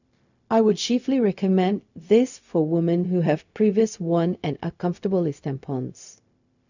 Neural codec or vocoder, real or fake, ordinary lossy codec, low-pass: codec, 16 kHz, 0.4 kbps, LongCat-Audio-Codec; fake; none; 7.2 kHz